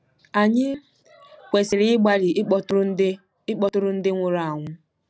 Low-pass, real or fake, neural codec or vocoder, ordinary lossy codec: none; real; none; none